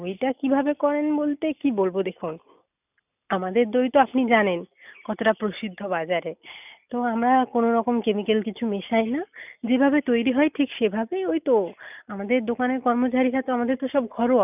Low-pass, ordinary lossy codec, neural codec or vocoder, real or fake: 3.6 kHz; none; none; real